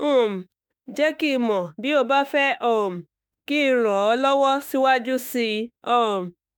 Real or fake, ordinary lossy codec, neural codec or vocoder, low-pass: fake; none; autoencoder, 48 kHz, 32 numbers a frame, DAC-VAE, trained on Japanese speech; none